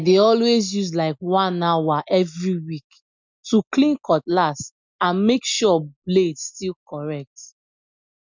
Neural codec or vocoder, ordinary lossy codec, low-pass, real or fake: none; MP3, 64 kbps; 7.2 kHz; real